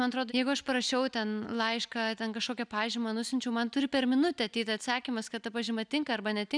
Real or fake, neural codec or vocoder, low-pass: real; none; 9.9 kHz